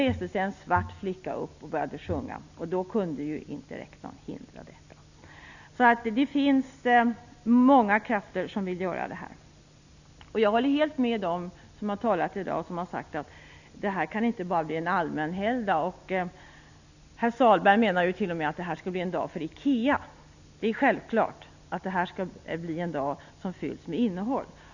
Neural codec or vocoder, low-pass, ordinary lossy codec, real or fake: none; 7.2 kHz; none; real